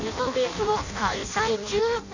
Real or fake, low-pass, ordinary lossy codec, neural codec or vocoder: fake; 7.2 kHz; none; codec, 16 kHz in and 24 kHz out, 0.6 kbps, FireRedTTS-2 codec